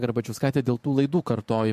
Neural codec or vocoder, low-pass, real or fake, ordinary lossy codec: vocoder, 44.1 kHz, 128 mel bands every 512 samples, BigVGAN v2; 14.4 kHz; fake; MP3, 64 kbps